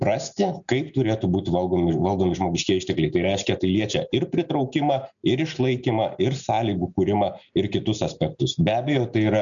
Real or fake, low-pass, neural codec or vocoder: real; 7.2 kHz; none